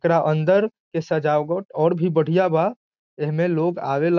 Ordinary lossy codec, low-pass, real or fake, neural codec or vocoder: none; 7.2 kHz; real; none